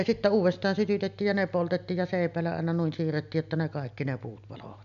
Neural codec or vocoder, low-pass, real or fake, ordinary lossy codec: none; 7.2 kHz; real; none